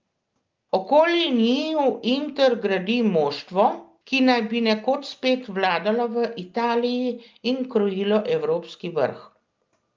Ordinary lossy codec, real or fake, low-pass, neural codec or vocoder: Opus, 32 kbps; real; 7.2 kHz; none